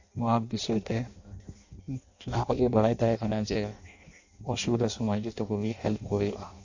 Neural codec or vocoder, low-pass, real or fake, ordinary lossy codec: codec, 16 kHz in and 24 kHz out, 0.6 kbps, FireRedTTS-2 codec; 7.2 kHz; fake; AAC, 48 kbps